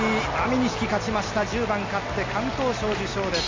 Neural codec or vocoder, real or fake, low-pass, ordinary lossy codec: none; real; 7.2 kHz; MP3, 48 kbps